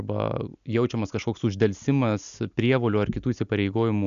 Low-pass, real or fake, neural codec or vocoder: 7.2 kHz; real; none